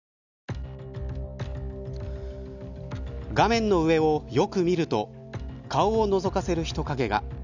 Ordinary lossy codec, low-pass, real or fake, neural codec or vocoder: none; 7.2 kHz; real; none